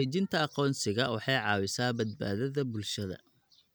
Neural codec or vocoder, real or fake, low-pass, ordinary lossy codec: none; real; none; none